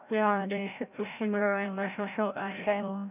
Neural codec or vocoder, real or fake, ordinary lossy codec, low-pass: codec, 16 kHz, 0.5 kbps, FreqCodec, larger model; fake; none; 3.6 kHz